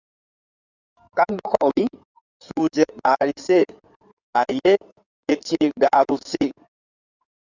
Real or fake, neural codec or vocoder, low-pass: fake; codec, 16 kHz in and 24 kHz out, 2.2 kbps, FireRedTTS-2 codec; 7.2 kHz